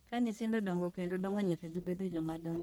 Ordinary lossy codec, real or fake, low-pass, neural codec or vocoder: none; fake; none; codec, 44.1 kHz, 1.7 kbps, Pupu-Codec